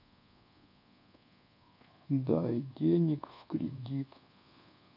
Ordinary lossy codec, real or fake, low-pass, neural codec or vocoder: none; fake; 5.4 kHz; codec, 24 kHz, 1.2 kbps, DualCodec